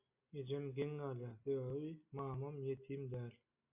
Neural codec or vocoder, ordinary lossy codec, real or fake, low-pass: none; MP3, 32 kbps; real; 3.6 kHz